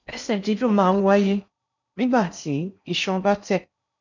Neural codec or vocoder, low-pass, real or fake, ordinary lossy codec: codec, 16 kHz in and 24 kHz out, 0.6 kbps, FocalCodec, streaming, 4096 codes; 7.2 kHz; fake; none